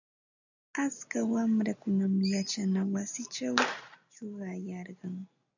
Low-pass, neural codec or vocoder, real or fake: 7.2 kHz; none; real